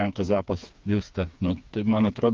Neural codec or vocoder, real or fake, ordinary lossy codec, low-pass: codec, 16 kHz, 4 kbps, FreqCodec, smaller model; fake; Opus, 24 kbps; 7.2 kHz